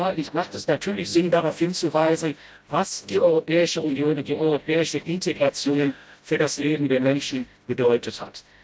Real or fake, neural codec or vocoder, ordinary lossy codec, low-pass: fake; codec, 16 kHz, 0.5 kbps, FreqCodec, smaller model; none; none